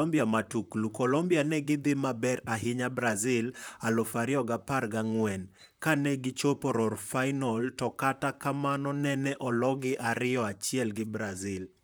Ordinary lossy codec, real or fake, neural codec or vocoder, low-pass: none; fake; vocoder, 44.1 kHz, 128 mel bands, Pupu-Vocoder; none